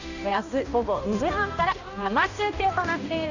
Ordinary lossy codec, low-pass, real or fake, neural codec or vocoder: none; 7.2 kHz; fake; codec, 16 kHz, 1 kbps, X-Codec, HuBERT features, trained on balanced general audio